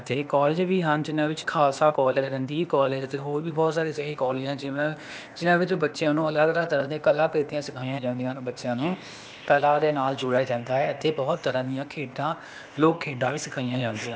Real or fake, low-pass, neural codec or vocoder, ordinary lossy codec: fake; none; codec, 16 kHz, 0.8 kbps, ZipCodec; none